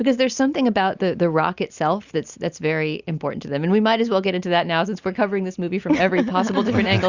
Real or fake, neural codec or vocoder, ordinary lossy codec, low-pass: real; none; Opus, 64 kbps; 7.2 kHz